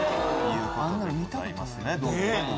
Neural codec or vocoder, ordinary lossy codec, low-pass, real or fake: none; none; none; real